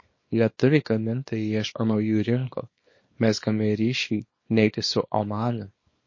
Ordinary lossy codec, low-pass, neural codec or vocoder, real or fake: MP3, 32 kbps; 7.2 kHz; codec, 24 kHz, 0.9 kbps, WavTokenizer, small release; fake